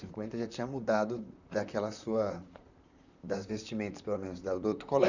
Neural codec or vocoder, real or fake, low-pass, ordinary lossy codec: vocoder, 44.1 kHz, 128 mel bands, Pupu-Vocoder; fake; 7.2 kHz; none